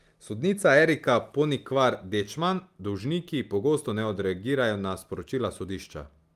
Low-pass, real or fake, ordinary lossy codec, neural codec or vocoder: 14.4 kHz; real; Opus, 32 kbps; none